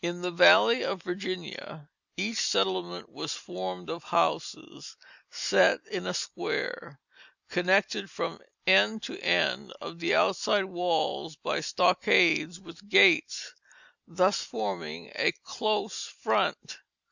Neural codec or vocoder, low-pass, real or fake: none; 7.2 kHz; real